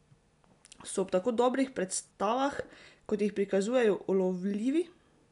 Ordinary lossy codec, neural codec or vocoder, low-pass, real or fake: none; none; 10.8 kHz; real